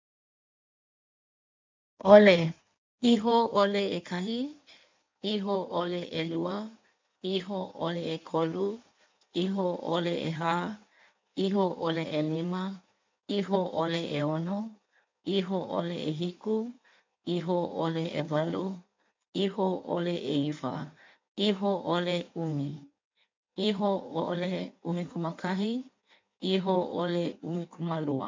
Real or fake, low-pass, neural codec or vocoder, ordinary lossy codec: fake; 7.2 kHz; codec, 16 kHz in and 24 kHz out, 1.1 kbps, FireRedTTS-2 codec; MP3, 64 kbps